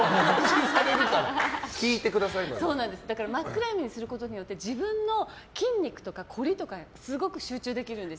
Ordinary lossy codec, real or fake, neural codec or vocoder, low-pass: none; real; none; none